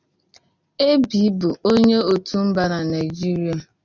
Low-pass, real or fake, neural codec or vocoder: 7.2 kHz; real; none